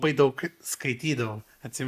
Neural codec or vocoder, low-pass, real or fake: none; 14.4 kHz; real